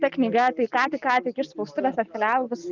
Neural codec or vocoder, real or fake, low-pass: none; real; 7.2 kHz